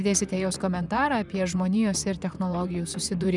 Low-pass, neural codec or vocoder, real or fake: 10.8 kHz; vocoder, 44.1 kHz, 128 mel bands, Pupu-Vocoder; fake